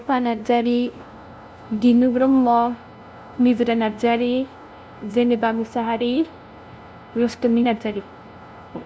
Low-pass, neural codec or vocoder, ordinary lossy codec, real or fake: none; codec, 16 kHz, 0.5 kbps, FunCodec, trained on LibriTTS, 25 frames a second; none; fake